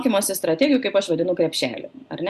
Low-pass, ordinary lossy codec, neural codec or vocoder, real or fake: 14.4 kHz; Opus, 64 kbps; none; real